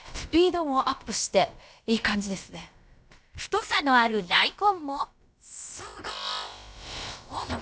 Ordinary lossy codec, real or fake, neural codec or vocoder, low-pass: none; fake; codec, 16 kHz, about 1 kbps, DyCAST, with the encoder's durations; none